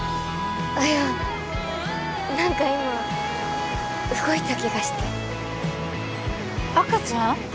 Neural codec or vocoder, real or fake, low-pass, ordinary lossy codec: none; real; none; none